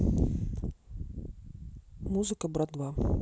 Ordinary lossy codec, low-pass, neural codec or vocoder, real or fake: none; none; none; real